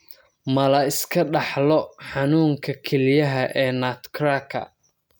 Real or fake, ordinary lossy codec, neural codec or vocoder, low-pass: real; none; none; none